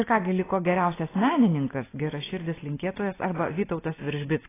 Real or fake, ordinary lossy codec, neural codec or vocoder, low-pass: real; AAC, 16 kbps; none; 3.6 kHz